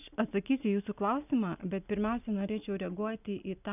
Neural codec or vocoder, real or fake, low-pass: none; real; 3.6 kHz